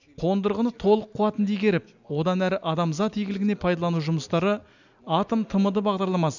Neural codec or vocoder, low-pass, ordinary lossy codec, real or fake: none; 7.2 kHz; none; real